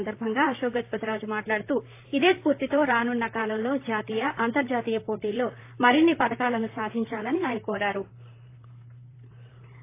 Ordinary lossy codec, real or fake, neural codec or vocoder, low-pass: AAC, 24 kbps; fake; vocoder, 44.1 kHz, 128 mel bands, Pupu-Vocoder; 3.6 kHz